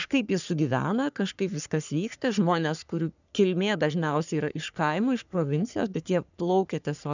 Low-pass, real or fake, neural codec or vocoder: 7.2 kHz; fake; codec, 44.1 kHz, 3.4 kbps, Pupu-Codec